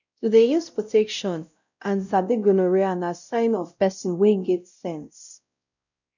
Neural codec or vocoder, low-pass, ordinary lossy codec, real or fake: codec, 16 kHz, 0.5 kbps, X-Codec, WavLM features, trained on Multilingual LibriSpeech; 7.2 kHz; none; fake